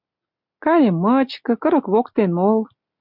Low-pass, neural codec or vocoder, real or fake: 5.4 kHz; none; real